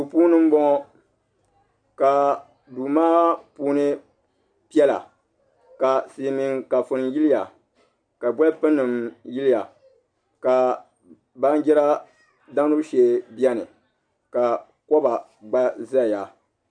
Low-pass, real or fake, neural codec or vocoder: 9.9 kHz; real; none